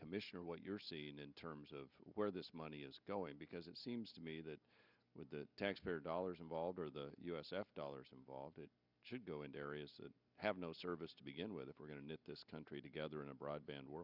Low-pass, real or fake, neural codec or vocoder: 5.4 kHz; real; none